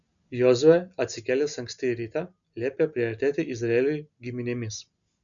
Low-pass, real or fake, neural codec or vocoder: 7.2 kHz; real; none